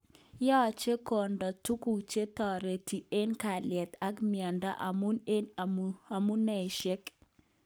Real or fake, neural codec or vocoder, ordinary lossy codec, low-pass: fake; codec, 44.1 kHz, 7.8 kbps, Pupu-Codec; none; none